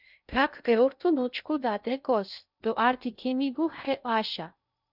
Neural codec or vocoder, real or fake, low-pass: codec, 16 kHz in and 24 kHz out, 0.6 kbps, FocalCodec, streaming, 2048 codes; fake; 5.4 kHz